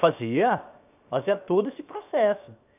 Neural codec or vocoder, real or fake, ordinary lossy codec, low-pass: codec, 16 kHz, 0.7 kbps, FocalCodec; fake; none; 3.6 kHz